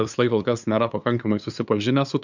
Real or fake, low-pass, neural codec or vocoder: fake; 7.2 kHz; codec, 16 kHz, 2 kbps, FunCodec, trained on LibriTTS, 25 frames a second